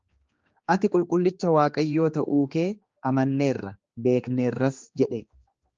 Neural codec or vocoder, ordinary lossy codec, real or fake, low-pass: codec, 16 kHz, 2 kbps, X-Codec, HuBERT features, trained on general audio; Opus, 24 kbps; fake; 7.2 kHz